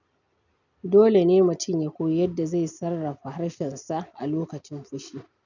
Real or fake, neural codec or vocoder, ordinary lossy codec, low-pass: real; none; none; 7.2 kHz